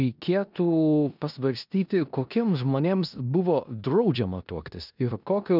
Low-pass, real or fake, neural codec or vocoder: 5.4 kHz; fake; codec, 16 kHz in and 24 kHz out, 0.9 kbps, LongCat-Audio-Codec, four codebook decoder